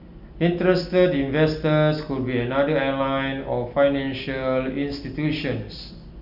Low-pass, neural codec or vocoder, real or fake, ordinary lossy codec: 5.4 kHz; none; real; none